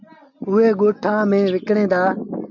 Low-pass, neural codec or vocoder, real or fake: 7.2 kHz; none; real